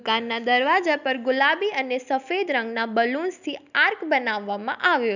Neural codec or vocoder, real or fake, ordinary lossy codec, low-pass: none; real; none; 7.2 kHz